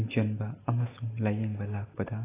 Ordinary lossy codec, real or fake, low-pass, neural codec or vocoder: AAC, 16 kbps; real; 3.6 kHz; none